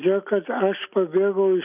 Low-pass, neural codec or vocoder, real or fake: 3.6 kHz; vocoder, 44.1 kHz, 128 mel bands every 256 samples, BigVGAN v2; fake